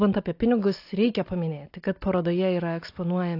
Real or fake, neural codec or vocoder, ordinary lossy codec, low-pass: real; none; AAC, 32 kbps; 5.4 kHz